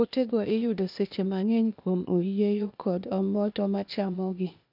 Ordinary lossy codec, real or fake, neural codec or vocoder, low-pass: none; fake; codec, 16 kHz, 0.8 kbps, ZipCodec; 5.4 kHz